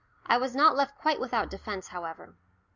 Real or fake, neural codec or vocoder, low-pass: real; none; 7.2 kHz